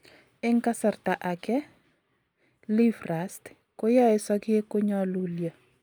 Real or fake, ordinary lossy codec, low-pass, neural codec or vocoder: real; none; none; none